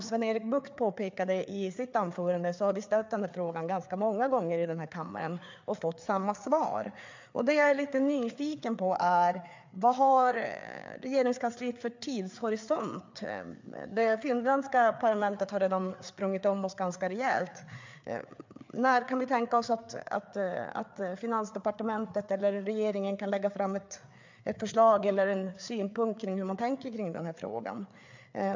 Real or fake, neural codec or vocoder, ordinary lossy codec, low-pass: fake; codec, 16 kHz, 4 kbps, FreqCodec, larger model; MP3, 64 kbps; 7.2 kHz